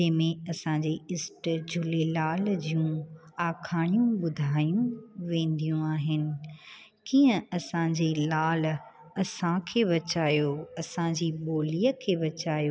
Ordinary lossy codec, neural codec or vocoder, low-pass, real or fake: none; none; none; real